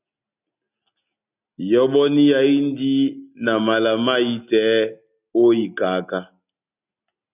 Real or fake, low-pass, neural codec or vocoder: real; 3.6 kHz; none